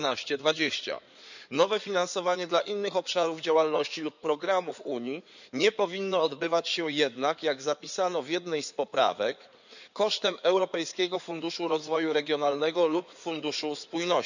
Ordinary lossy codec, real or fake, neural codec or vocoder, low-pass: none; fake; codec, 16 kHz in and 24 kHz out, 2.2 kbps, FireRedTTS-2 codec; 7.2 kHz